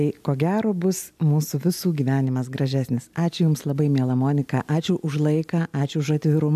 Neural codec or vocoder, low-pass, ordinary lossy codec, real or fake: none; 14.4 kHz; AAC, 96 kbps; real